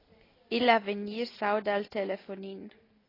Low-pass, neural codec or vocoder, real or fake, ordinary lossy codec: 5.4 kHz; none; real; AAC, 24 kbps